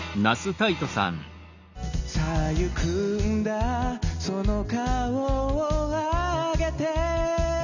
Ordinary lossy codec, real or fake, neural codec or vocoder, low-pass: none; real; none; 7.2 kHz